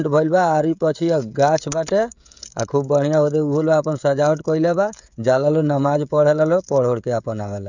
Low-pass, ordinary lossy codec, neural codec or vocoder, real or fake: 7.2 kHz; none; codec, 16 kHz, 16 kbps, FreqCodec, smaller model; fake